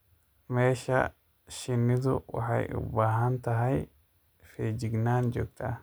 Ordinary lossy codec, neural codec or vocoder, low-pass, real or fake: none; none; none; real